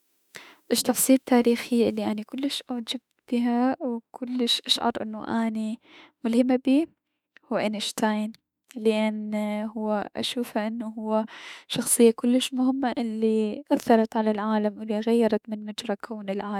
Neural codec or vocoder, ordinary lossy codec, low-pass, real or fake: autoencoder, 48 kHz, 32 numbers a frame, DAC-VAE, trained on Japanese speech; none; 19.8 kHz; fake